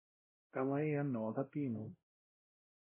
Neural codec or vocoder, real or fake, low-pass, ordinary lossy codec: codec, 16 kHz, 0.5 kbps, X-Codec, WavLM features, trained on Multilingual LibriSpeech; fake; 3.6 kHz; MP3, 16 kbps